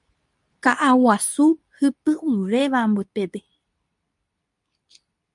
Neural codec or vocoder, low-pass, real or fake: codec, 24 kHz, 0.9 kbps, WavTokenizer, medium speech release version 2; 10.8 kHz; fake